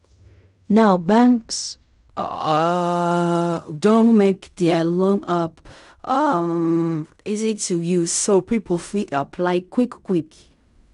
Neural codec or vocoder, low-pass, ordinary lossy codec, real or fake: codec, 16 kHz in and 24 kHz out, 0.4 kbps, LongCat-Audio-Codec, fine tuned four codebook decoder; 10.8 kHz; none; fake